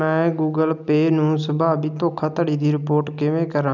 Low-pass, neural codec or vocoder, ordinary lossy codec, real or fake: 7.2 kHz; none; none; real